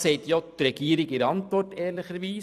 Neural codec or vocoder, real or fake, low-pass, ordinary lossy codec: vocoder, 44.1 kHz, 128 mel bands every 512 samples, BigVGAN v2; fake; 14.4 kHz; none